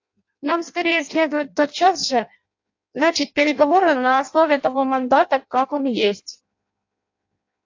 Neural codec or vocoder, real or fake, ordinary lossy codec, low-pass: codec, 16 kHz in and 24 kHz out, 0.6 kbps, FireRedTTS-2 codec; fake; AAC, 48 kbps; 7.2 kHz